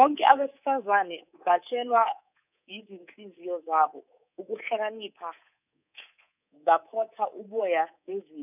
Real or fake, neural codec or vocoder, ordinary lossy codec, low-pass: fake; codec, 24 kHz, 3.1 kbps, DualCodec; none; 3.6 kHz